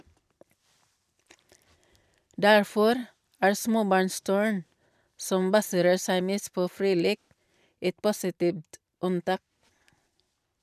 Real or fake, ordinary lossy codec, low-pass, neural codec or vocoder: fake; none; 14.4 kHz; vocoder, 44.1 kHz, 128 mel bands every 256 samples, BigVGAN v2